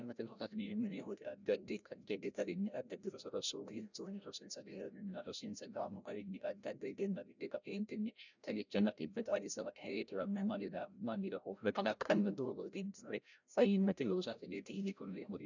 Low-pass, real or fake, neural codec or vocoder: 7.2 kHz; fake; codec, 16 kHz, 0.5 kbps, FreqCodec, larger model